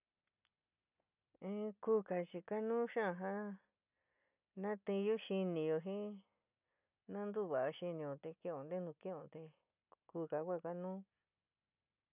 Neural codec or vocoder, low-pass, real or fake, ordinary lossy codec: none; 3.6 kHz; real; none